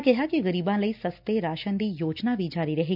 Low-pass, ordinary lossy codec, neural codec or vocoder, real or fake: 5.4 kHz; none; none; real